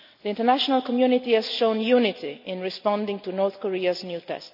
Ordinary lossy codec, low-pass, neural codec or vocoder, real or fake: none; 5.4 kHz; none; real